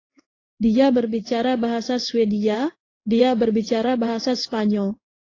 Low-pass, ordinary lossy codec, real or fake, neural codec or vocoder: 7.2 kHz; AAC, 32 kbps; fake; codec, 16 kHz in and 24 kHz out, 1 kbps, XY-Tokenizer